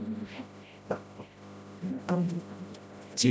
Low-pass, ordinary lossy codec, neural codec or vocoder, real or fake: none; none; codec, 16 kHz, 0.5 kbps, FreqCodec, smaller model; fake